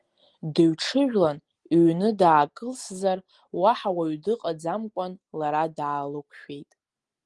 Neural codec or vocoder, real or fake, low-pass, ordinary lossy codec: none; real; 10.8 kHz; Opus, 24 kbps